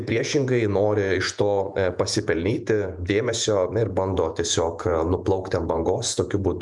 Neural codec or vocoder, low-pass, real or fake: vocoder, 48 kHz, 128 mel bands, Vocos; 10.8 kHz; fake